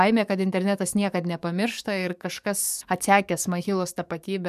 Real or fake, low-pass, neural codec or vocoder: fake; 14.4 kHz; codec, 44.1 kHz, 7.8 kbps, DAC